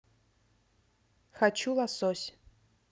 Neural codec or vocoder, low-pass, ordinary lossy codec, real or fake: none; none; none; real